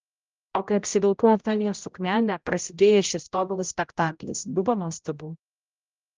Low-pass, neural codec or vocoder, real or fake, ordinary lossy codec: 7.2 kHz; codec, 16 kHz, 0.5 kbps, X-Codec, HuBERT features, trained on general audio; fake; Opus, 32 kbps